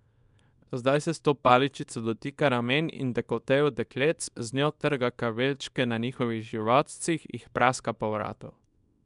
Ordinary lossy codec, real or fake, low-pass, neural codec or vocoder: none; fake; 10.8 kHz; codec, 24 kHz, 0.9 kbps, WavTokenizer, small release